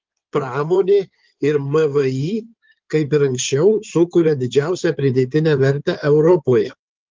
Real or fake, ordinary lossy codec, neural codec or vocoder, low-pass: fake; Opus, 32 kbps; codec, 16 kHz in and 24 kHz out, 2.2 kbps, FireRedTTS-2 codec; 7.2 kHz